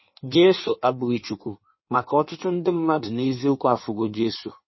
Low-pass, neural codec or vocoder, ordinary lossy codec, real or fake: 7.2 kHz; codec, 16 kHz in and 24 kHz out, 1.1 kbps, FireRedTTS-2 codec; MP3, 24 kbps; fake